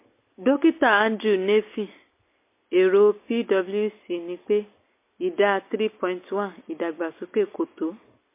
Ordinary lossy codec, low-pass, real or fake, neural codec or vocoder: MP3, 24 kbps; 3.6 kHz; real; none